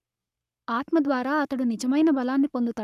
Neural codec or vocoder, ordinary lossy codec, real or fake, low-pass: codec, 44.1 kHz, 7.8 kbps, Pupu-Codec; none; fake; 14.4 kHz